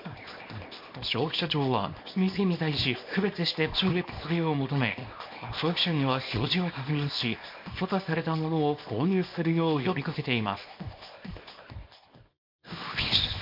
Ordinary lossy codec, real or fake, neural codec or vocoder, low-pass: MP3, 32 kbps; fake; codec, 24 kHz, 0.9 kbps, WavTokenizer, small release; 5.4 kHz